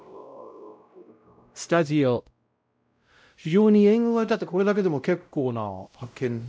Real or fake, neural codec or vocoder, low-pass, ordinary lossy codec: fake; codec, 16 kHz, 0.5 kbps, X-Codec, WavLM features, trained on Multilingual LibriSpeech; none; none